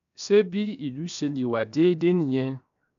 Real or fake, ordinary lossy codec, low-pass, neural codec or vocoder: fake; none; 7.2 kHz; codec, 16 kHz, 0.7 kbps, FocalCodec